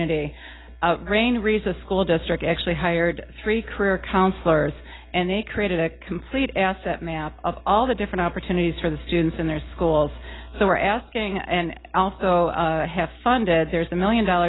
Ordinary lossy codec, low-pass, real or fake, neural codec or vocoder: AAC, 16 kbps; 7.2 kHz; real; none